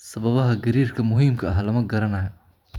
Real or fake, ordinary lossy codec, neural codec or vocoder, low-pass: fake; none; vocoder, 44.1 kHz, 128 mel bands every 512 samples, BigVGAN v2; 19.8 kHz